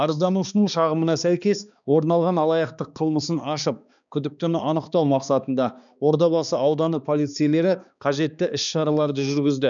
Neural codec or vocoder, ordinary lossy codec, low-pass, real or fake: codec, 16 kHz, 2 kbps, X-Codec, HuBERT features, trained on balanced general audio; none; 7.2 kHz; fake